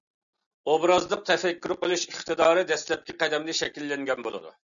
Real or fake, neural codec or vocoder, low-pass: real; none; 7.2 kHz